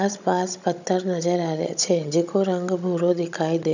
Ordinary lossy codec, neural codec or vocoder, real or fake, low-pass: none; codec, 16 kHz, 16 kbps, FunCodec, trained on Chinese and English, 50 frames a second; fake; 7.2 kHz